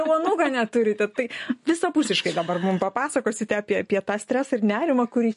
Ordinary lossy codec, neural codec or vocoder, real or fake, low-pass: MP3, 48 kbps; none; real; 10.8 kHz